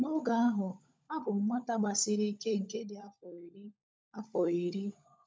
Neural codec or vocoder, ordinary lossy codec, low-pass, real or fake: codec, 16 kHz, 16 kbps, FunCodec, trained on LibriTTS, 50 frames a second; none; none; fake